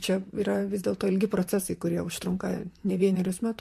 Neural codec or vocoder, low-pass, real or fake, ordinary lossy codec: vocoder, 44.1 kHz, 128 mel bands, Pupu-Vocoder; 14.4 kHz; fake; MP3, 64 kbps